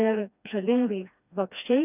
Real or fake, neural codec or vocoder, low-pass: fake; codec, 16 kHz, 2 kbps, FreqCodec, smaller model; 3.6 kHz